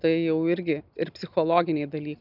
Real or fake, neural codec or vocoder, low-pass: real; none; 5.4 kHz